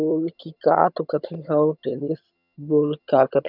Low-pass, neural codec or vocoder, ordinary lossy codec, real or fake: 5.4 kHz; vocoder, 22.05 kHz, 80 mel bands, HiFi-GAN; none; fake